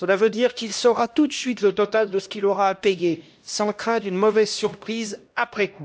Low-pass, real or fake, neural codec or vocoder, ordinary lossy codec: none; fake; codec, 16 kHz, 1 kbps, X-Codec, HuBERT features, trained on LibriSpeech; none